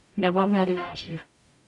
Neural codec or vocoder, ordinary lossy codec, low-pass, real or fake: codec, 44.1 kHz, 0.9 kbps, DAC; none; 10.8 kHz; fake